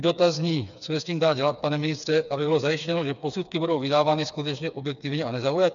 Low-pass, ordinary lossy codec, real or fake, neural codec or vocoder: 7.2 kHz; MP3, 96 kbps; fake; codec, 16 kHz, 4 kbps, FreqCodec, smaller model